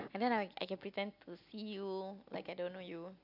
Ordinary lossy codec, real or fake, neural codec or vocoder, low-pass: AAC, 48 kbps; real; none; 5.4 kHz